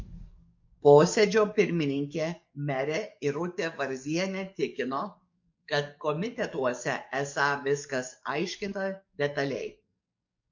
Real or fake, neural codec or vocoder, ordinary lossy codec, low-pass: fake; codec, 44.1 kHz, 7.8 kbps, DAC; MP3, 48 kbps; 7.2 kHz